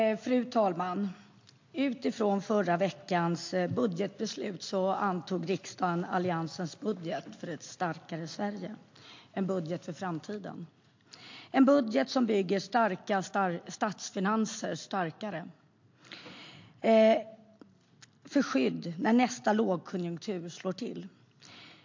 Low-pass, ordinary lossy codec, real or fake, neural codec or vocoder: 7.2 kHz; MP3, 48 kbps; real; none